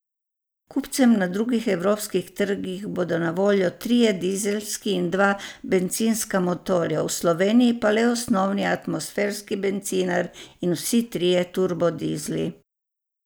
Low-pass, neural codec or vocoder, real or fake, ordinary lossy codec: none; none; real; none